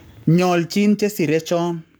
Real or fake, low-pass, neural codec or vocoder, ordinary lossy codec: fake; none; codec, 44.1 kHz, 7.8 kbps, Pupu-Codec; none